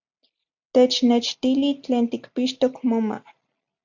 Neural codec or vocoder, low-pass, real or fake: none; 7.2 kHz; real